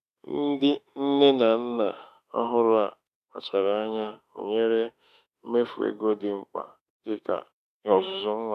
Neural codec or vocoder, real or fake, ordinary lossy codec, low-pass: autoencoder, 48 kHz, 32 numbers a frame, DAC-VAE, trained on Japanese speech; fake; none; 14.4 kHz